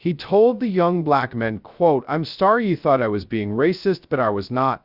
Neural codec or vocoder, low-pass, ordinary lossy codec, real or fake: codec, 16 kHz, 0.2 kbps, FocalCodec; 5.4 kHz; Opus, 64 kbps; fake